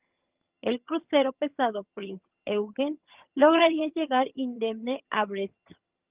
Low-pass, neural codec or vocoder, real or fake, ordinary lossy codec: 3.6 kHz; vocoder, 22.05 kHz, 80 mel bands, HiFi-GAN; fake; Opus, 24 kbps